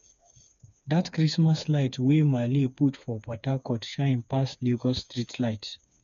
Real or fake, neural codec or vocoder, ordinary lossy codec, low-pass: fake; codec, 16 kHz, 4 kbps, FreqCodec, smaller model; none; 7.2 kHz